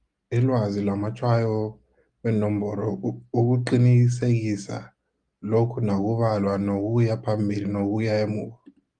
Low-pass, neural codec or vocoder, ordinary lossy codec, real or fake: 9.9 kHz; none; Opus, 32 kbps; real